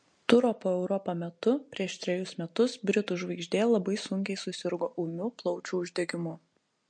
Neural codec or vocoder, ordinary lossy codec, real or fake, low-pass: none; MP3, 48 kbps; real; 9.9 kHz